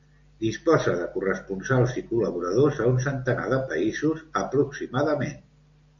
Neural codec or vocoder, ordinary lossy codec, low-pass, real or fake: none; AAC, 64 kbps; 7.2 kHz; real